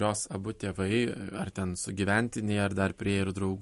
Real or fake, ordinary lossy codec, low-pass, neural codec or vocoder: fake; MP3, 48 kbps; 14.4 kHz; vocoder, 44.1 kHz, 128 mel bands every 512 samples, BigVGAN v2